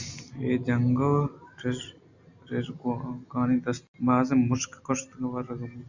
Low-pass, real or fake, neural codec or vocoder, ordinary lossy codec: 7.2 kHz; real; none; Opus, 64 kbps